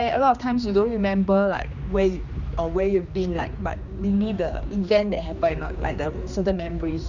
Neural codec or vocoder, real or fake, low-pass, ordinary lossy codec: codec, 16 kHz, 2 kbps, X-Codec, HuBERT features, trained on general audio; fake; 7.2 kHz; none